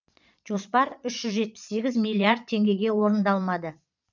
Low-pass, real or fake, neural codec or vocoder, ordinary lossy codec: 7.2 kHz; fake; vocoder, 22.05 kHz, 80 mel bands, Vocos; none